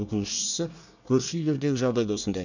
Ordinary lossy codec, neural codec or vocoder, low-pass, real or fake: none; codec, 24 kHz, 1 kbps, SNAC; 7.2 kHz; fake